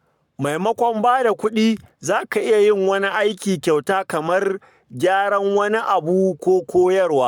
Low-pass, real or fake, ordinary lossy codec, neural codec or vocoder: 19.8 kHz; fake; none; codec, 44.1 kHz, 7.8 kbps, Pupu-Codec